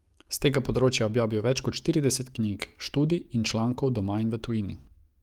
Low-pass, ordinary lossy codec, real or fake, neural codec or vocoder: 19.8 kHz; Opus, 32 kbps; fake; codec, 44.1 kHz, 7.8 kbps, DAC